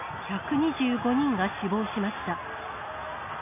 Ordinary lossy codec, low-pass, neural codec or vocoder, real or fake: none; 3.6 kHz; none; real